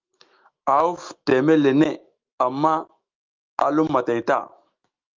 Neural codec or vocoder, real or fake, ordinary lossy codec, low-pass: autoencoder, 48 kHz, 128 numbers a frame, DAC-VAE, trained on Japanese speech; fake; Opus, 24 kbps; 7.2 kHz